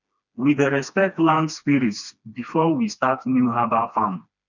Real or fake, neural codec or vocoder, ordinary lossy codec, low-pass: fake; codec, 16 kHz, 2 kbps, FreqCodec, smaller model; none; 7.2 kHz